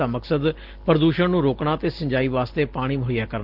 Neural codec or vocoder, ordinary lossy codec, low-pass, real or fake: none; Opus, 16 kbps; 5.4 kHz; real